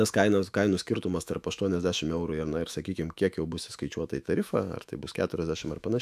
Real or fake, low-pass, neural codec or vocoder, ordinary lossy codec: fake; 14.4 kHz; autoencoder, 48 kHz, 128 numbers a frame, DAC-VAE, trained on Japanese speech; AAC, 96 kbps